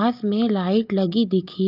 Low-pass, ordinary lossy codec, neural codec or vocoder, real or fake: 5.4 kHz; Opus, 24 kbps; none; real